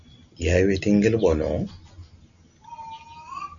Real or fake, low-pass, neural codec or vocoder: real; 7.2 kHz; none